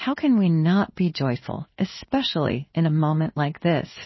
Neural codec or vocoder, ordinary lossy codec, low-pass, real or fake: codec, 16 kHz in and 24 kHz out, 1 kbps, XY-Tokenizer; MP3, 24 kbps; 7.2 kHz; fake